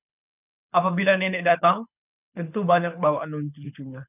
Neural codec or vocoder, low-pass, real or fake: codec, 24 kHz, 6 kbps, HILCodec; 3.6 kHz; fake